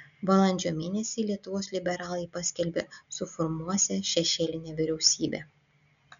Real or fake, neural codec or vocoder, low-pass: real; none; 7.2 kHz